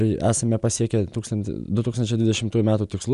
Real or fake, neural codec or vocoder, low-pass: real; none; 10.8 kHz